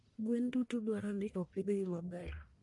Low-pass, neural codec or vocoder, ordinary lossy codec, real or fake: 10.8 kHz; codec, 44.1 kHz, 1.7 kbps, Pupu-Codec; MP3, 48 kbps; fake